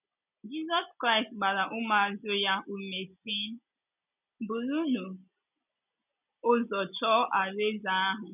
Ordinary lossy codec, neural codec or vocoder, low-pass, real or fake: none; none; 3.6 kHz; real